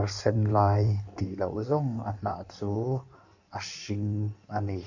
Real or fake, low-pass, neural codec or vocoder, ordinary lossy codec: fake; 7.2 kHz; codec, 16 kHz in and 24 kHz out, 1.1 kbps, FireRedTTS-2 codec; none